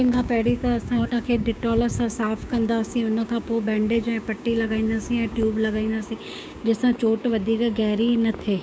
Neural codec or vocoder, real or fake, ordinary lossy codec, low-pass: codec, 16 kHz, 6 kbps, DAC; fake; none; none